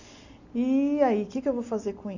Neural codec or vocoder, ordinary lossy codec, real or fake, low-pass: none; none; real; 7.2 kHz